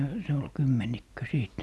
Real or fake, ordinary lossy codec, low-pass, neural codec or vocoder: real; none; none; none